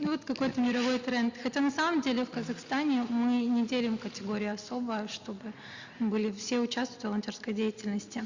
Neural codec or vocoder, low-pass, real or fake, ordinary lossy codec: none; 7.2 kHz; real; Opus, 64 kbps